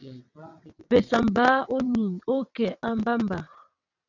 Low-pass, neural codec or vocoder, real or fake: 7.2 kHz; vocoder, 22.05 kHz, 80 mel bands, WaveNeXt; fake